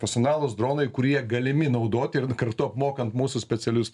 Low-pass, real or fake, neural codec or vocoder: 10.8 kHz; real; none